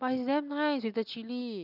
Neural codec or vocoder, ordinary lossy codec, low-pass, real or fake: none; none; 5.4 kHz; real